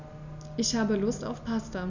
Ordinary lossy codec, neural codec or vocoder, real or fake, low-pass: none; none; real; 7.2 kHz